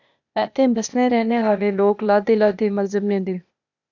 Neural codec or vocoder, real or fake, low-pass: codec, 16 kHz, 0.8 kbps, ZipCodec; fake; 7.2 kHz